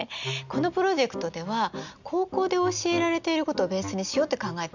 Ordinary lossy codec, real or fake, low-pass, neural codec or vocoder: none; real; 7.2 kHz; none